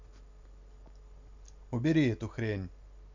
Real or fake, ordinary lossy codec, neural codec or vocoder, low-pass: real; none; none; 7.2 kHz